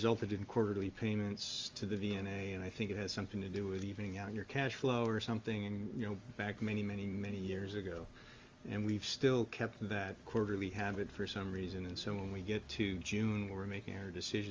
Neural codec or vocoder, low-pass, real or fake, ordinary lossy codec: none; 7.2 kHz; real; Opus, 32 kbps